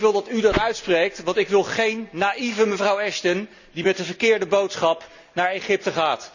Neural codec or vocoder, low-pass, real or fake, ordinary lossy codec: none; 7.2 kHz; real; none